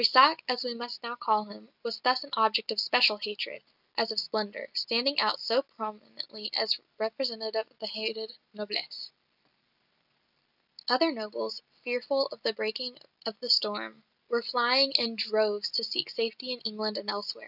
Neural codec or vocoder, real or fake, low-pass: none; real; 5.4 kHz